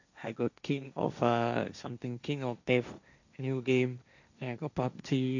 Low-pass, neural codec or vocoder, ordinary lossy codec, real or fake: 7.2 kHz; codec, 16 kHz, 1.1 kbps, Voila-Tokenizer; none; fake